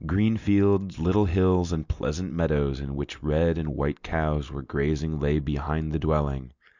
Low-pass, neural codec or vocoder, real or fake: 7.2 kHz; none; real